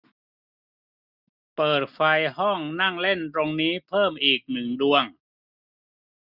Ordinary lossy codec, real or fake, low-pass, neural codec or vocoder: none; real; 5.4 kHz; none